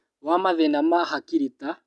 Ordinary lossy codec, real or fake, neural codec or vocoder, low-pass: none; real; none; none